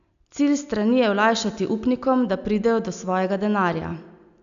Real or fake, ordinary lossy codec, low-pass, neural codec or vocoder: real; AAC, 96 kbps; 7.2 kHz; none